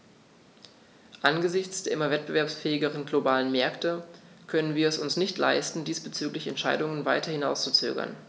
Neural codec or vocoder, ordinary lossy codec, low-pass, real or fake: none; none; none; real